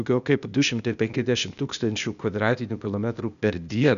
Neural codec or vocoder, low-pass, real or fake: codec, 16 kHz, 0.8 kbps, ZipCodec; 7.2 kHz; fake